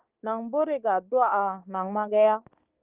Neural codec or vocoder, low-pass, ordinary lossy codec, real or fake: codec, 16 kHz, 6 kbps, DAC; 3.6 kHz; Opus, 32 kbps; fake